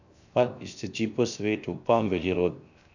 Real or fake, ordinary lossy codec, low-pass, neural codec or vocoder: fake; none; 7.2 kHz; codec, 16 kHz, 0.7 kbps, FocalCodec